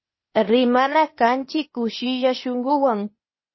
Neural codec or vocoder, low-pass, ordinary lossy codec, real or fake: codec, 16 kHz, 0.8 kbps, ZipCodec; 7.2 kHz; MP3, 24 kbps; fake